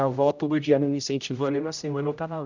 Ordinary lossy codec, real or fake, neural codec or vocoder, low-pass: none; fake; codec, 16 kHz, 0.5 kbps, X-Codec, HuBERT features, trained on general audio; 7.2 kHz